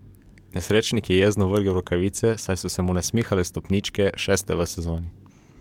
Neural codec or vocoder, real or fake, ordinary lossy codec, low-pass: codec, 44.1 kHz, 7.8 kbps, DAC; fake; MP3, 96 kbps; 19.8 kHz